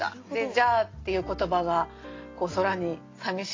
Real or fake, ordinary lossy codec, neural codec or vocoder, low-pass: real; AAC, 32 kbps; none; 7.2 kHz